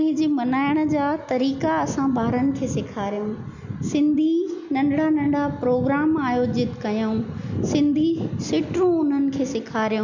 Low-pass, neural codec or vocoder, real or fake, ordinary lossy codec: 7.2 kHz; none; real; none